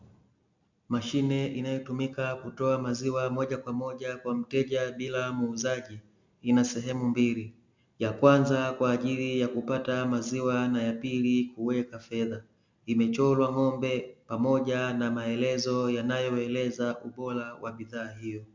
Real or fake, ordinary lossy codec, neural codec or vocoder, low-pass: real; MP3, 64 kbps; none; 7.2 kHz